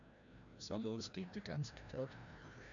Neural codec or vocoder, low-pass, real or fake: codec, 16 kHz, 1 kbps, FreqCodec, larger model; 7.2 kHz; fake